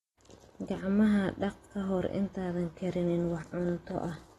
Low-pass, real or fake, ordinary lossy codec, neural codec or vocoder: 19.8 kHz; real; AAC, 32 kbps; none